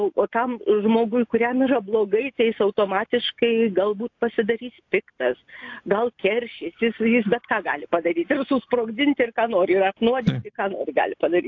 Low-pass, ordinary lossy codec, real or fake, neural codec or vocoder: 7.2 kHz; AAC, 48 kbps; real; none